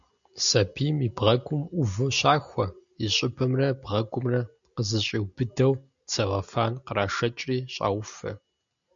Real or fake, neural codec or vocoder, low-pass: real; none; 7.2 kHz